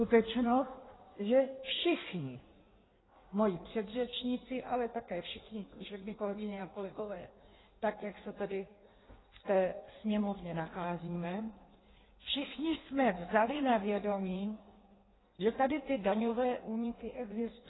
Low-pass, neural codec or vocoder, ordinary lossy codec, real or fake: 7.2 kHz; codec, 16 kHz in and 24 kHz out, 1.1 kbps, FireRedTTS-2 codec; AAC, 16 kbps; fake